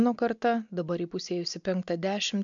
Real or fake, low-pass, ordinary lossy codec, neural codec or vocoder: real; 7.2 kHz; MP3, 64 kbps; none